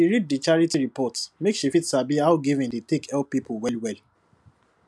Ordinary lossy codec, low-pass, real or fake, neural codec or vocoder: none; none; real; none